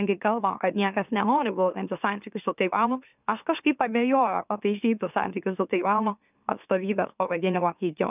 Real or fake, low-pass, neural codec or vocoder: fake; 3.6 kHz; autoencoder, 44.1 kHz, a latent of 192 numbers a frame, MeloTTS